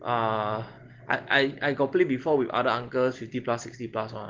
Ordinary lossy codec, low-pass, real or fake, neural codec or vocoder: Opus, 16 kbps; 7.2 kHz; real; none